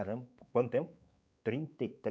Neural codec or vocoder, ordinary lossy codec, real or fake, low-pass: codec, 16 kHz, 4 kbps, X-Codec, WavLM features, trained on Multilingual LibriSpeech; none; fake; none